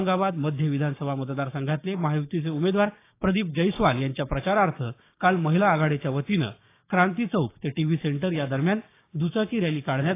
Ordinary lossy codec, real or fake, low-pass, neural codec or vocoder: AAC, 24 kbps; fake; 3.6 kHz; codec, 16 kHz, 6 kbps, DAC